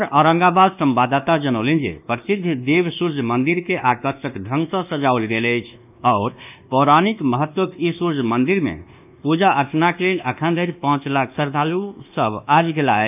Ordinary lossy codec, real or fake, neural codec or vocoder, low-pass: none; fake; codec, 24 kHz, 1.2 kbps, DualCodec; 3.6 kHz